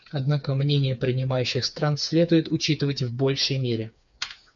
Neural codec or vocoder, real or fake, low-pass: codec, 16 kHz, 4 kbps, FreqCodec, smaller model; fake; 7.2 kHz